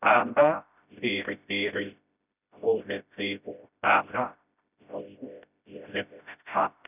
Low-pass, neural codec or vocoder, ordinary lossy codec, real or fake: 3.6 kHz; codec, 16 kHz, 0.5 kbps, FreqCodec, smaller model; none; fake